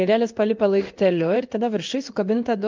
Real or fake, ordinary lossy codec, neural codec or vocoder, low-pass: fake; Opus, 24 kbps; codec, 16 kHz in and 24 kHz out, 1 kbps, XY-Tokenizer; 7.2 kHz